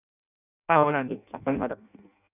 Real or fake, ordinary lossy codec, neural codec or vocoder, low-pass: fake; none; codec, 16 kHz in and 24 kHz out, 0.6 kbps, FireRedTTS-2 codec; 3.6 kHz